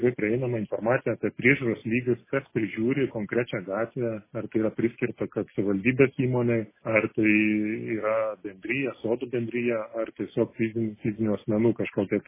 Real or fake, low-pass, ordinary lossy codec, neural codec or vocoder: real; 3.6 kHz; MP3, 16 kbps; none